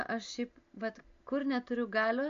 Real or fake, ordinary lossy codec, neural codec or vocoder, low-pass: real; MP3, 48 kbps; none; 7.2 kHz